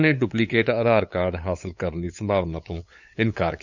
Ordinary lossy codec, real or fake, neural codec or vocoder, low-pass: none; fake; codec, 16 kHz, 8 kbps, FunCodec, trained on LibriTTS, 25 frames a second; 7.2 kHz